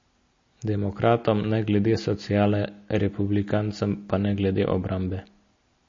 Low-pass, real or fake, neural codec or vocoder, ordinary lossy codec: 7.2 kHz; real; none; MP3, 32 kbps